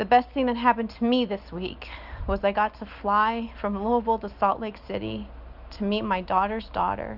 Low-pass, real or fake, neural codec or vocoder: 5.4 kHz; real; none